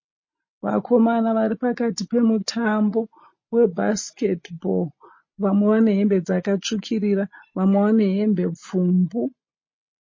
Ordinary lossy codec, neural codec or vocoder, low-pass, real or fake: MP3, 32 kbps; none; 7.2 kHz; real